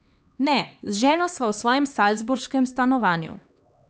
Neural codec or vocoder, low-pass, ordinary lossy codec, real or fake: codec, 16 kHz, 2 kbps, X-Codec, HuBERT features, trained on LibriSpeech; none; none; fake